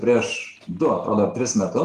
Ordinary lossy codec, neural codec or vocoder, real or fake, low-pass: Opus, 24 kbps; none; real; 14.4 kHz